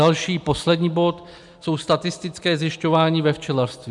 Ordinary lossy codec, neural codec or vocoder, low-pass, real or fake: MP3, 96 kbps; none; 10.8 kHz; real